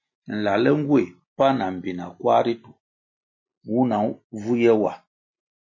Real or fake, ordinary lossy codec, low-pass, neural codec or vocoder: real; MP3, 32 kbps; 7.2 kHz; none